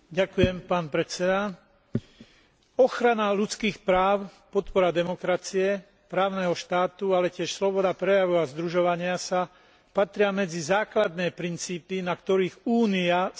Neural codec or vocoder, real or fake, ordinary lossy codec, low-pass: none; real; none; none